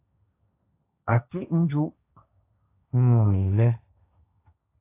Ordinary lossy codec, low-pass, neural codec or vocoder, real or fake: AAC, 32 kbps; 3.6 kHz; codec, 16 kHz, 1.1 kbps, Voila-Tokenizer; fake